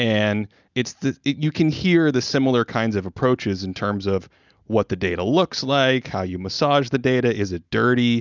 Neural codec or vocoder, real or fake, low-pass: none; real; 7.2 kHz